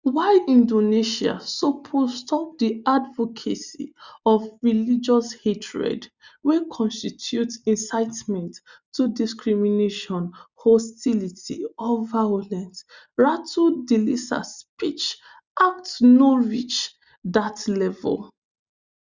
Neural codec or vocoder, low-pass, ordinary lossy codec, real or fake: none; 7.2 kHz; Opus, 64 kbps; real